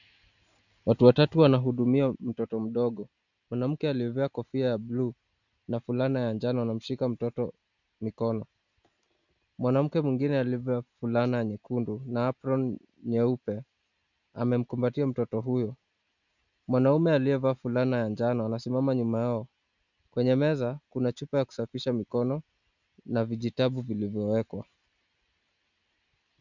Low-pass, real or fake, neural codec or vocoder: 7.2 kHz; real; none